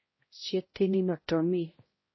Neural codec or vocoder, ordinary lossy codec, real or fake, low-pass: codec, 16 kHz, 0.5 kbps, X-Codec, HuBERT features, trained on balanced general audio; MP3, 24 kbps; fake; 7.2 kHz